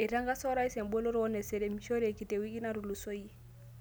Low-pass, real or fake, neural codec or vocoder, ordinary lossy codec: none; real; none; none